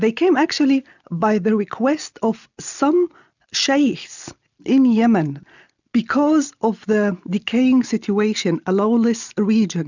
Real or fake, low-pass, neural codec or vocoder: real; 7.2 kHz; none